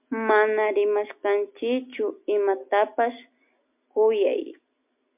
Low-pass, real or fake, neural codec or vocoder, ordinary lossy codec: 3.6 kHz; real; none; MP3, 32 kbps